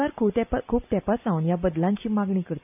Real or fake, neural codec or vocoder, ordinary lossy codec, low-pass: real; none; none; 3.6 kHz